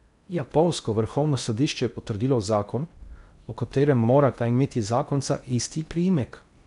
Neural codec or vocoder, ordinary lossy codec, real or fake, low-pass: codec, 16 kHz in and 24 kHz out, 0.6 kbps, FocalCodec, streaming, 2048 codes; none; fake; 10.8 kHz